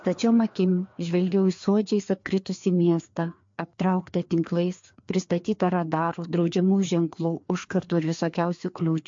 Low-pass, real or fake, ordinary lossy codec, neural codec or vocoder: 7.2 kHz; fake; MP3, 48 kbps; codec, 16 kHz, 2 kbps, FreqCodec, larger model